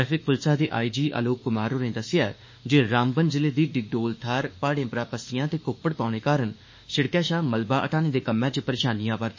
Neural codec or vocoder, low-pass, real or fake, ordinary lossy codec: autoencoder, 48 kHz, 32 numbers a frame, DAC-VAE, trained on Japanese speech; 7.2 kHz; fake; MP3, 32 kbps